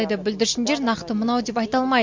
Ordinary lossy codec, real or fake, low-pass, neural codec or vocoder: MP3, 64 kbps; real; 7.2 kHz; none